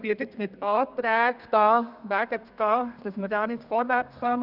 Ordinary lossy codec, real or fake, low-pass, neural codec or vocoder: Opus, 64 kbps; fake; 5.4 kHz; codec, 32 kHz, 1.9 kbps, SNAC